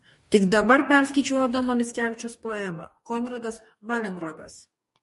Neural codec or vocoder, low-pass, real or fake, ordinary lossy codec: codec, 44.1 kHz, 2.6 kbps, DAC; 14.4 kHz; fake; MP3, 48 kbps